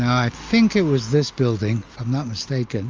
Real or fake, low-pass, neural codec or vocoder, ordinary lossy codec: real; 7.2 kHz; none; Opus, 32 kbps